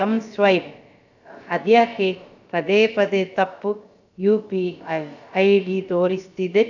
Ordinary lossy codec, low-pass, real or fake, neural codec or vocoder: none; 7.2 kHz; fake; codec, 16 kHz, about 1 kbps, DyCAST, with the encoder's durations